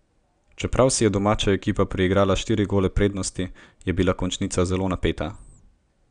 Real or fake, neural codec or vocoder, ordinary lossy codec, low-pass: real; none; none; 9.9 kHz